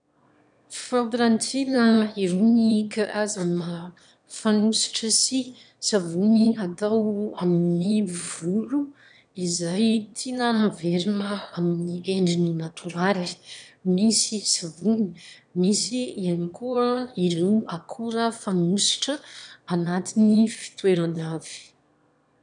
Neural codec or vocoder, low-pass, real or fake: autoencoder, 22.05 kHz, a latent of 192 numbers a frame, VITS, trained on one speaker; 9.9 kHz; fake